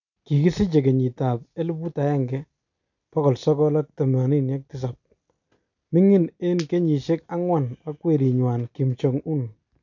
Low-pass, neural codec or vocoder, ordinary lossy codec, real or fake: 7.2 kHz; none; none; real